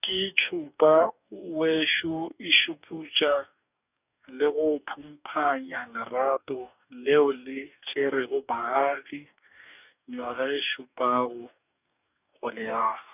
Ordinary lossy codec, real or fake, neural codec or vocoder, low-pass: none; fake; codec, 44.1 kHz, 2.6 kbps, DAC; 3.6 kHz